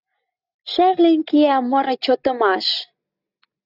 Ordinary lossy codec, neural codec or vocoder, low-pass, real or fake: Opus, 64 kbps; none; 5.4 kHz; real